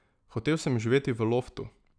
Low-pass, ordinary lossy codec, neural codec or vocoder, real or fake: 9.9 kHz; none; none; real